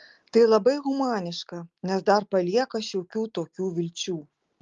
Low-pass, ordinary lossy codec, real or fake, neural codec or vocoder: 7.2 kHz; Opus, 32 kbps; real; none